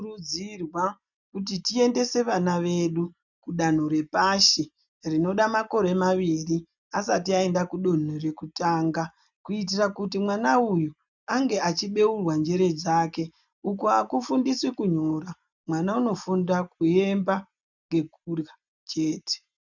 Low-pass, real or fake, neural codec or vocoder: 7.2 kHz; real; none